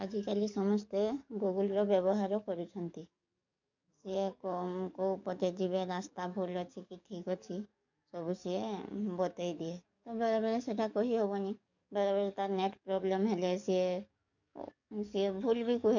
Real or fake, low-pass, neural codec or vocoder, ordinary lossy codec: fake; 7.2 kHz; codec, 44.1 kHz, 7.8 kbps, DAC; none